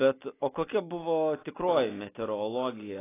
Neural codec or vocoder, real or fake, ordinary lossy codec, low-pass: none; real; AAC, 16 kbps; 3.6 kHz